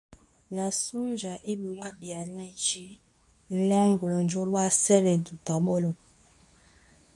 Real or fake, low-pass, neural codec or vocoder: fake; 10.8 kHz; codec, 24 kHz, 0.9 kbps, WavTokenizer, medium speech release version 2